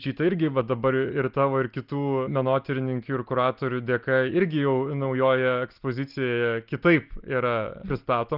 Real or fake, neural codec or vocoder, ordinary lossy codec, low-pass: real; none; Opus, 32 kbps; 5.4 kHz